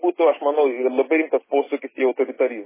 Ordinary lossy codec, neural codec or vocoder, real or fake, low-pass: MP3, 16 kbps; none; real; 3.6 kHz